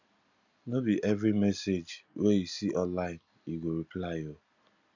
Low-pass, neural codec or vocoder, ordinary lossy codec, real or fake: 7.2 kHz; none; none; real